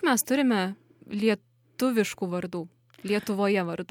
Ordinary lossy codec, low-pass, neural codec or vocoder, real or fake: MP3, 96 kbps; 19.8 kHz; none; real